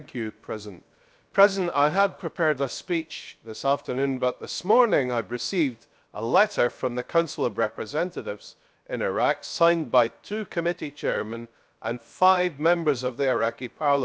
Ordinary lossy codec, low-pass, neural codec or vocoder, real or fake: none; none; codec, 16 kHz, 0.7 kbps, FocalCodec; fake